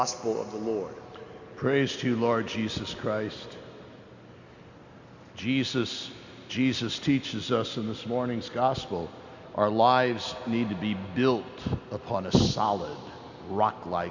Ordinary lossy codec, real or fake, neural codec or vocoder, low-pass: Opus, 64 kbps; real; none; 7.2 kHz